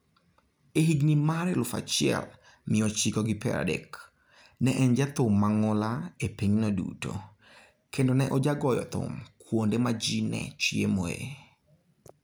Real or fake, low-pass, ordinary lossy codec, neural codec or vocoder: real; none; none; none